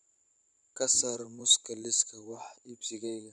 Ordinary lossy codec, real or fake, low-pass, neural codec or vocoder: none; fake; 10.8 kHz; vocoder, 44.1 kHz, 128 mel bands every 512 samples, BigVGAN v2